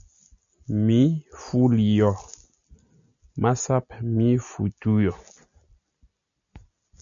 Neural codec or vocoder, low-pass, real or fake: none; 7.2 kHz; real